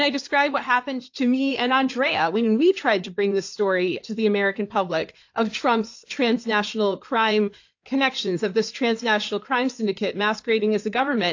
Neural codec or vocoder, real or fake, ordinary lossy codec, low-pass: codec, 16 kHz in and 24 kHz out, 2.2 kbps, FireRedTTS-2 codec; fake; AAC, 48 kbps; 7.2 kHz